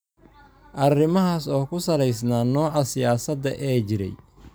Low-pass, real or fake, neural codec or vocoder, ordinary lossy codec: none; real; none; none